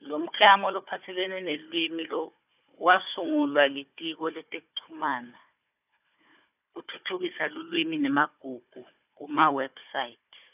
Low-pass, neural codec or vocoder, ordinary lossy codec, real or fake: 3.6 kHz; codec, 16 kHz, 4 kbps, FunCodec, trained on Chinese and English, 50 frames a second; none; fake